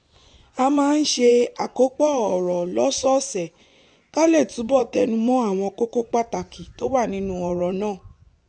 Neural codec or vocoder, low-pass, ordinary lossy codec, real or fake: vocoder, 24 kHz, 100 mel bands, Vocos; 9.9 kHz; none; fake